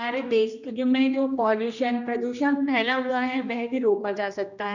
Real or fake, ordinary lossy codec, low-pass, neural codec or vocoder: fake; none; 7.2 kHz; codec, 16 kHz, 1 kbps, X-Codec, HuBERT features, trained on general audio